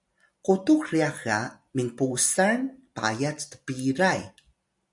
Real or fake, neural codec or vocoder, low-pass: real; none; 10.8 kHz